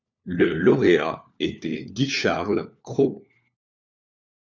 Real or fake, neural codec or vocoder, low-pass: fake; codec, 16 kHz, 4 kbps, FunCodec, trained on LibriTTS, 50 frames a second; 7.2 kHz